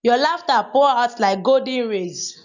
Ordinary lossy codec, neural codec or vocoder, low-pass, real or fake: none; none; 7.2 kHz; real